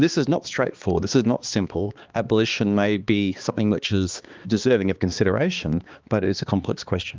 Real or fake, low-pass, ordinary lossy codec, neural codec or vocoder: fake; 7.2 kHz; Opus, 24 kbps; codec, 16 kHz, 2 kbps, X-Codec, HuBERT features, trained on balanced general audio